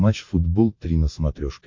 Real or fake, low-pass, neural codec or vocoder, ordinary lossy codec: real; 7.2 kHz; none; MP3, 48 kbps